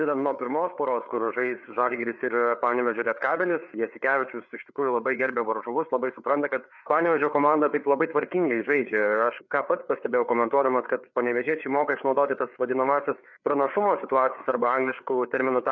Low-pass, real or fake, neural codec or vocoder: 7.2 kHz; fake; codec, 16 kHz, 4 kbps, FreqCodec, larger model